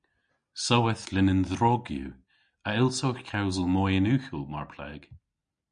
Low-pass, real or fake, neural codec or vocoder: 9.9 kHz; real; none